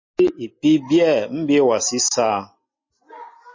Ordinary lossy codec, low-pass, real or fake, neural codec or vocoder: MP3, 32 kbps; 7.2 kHz; real; none